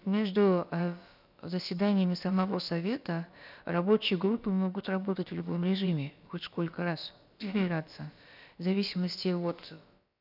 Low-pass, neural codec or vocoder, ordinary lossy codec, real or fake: 5.4 kHz; codec, 16 kHz, about 1 kbps, DyCAST, with the encoder's durations; none; fake